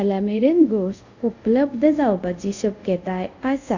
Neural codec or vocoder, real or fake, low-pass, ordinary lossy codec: codec, 24 kHz, 0.5 kbps, DualCodec; fake; 7.2 kHz; none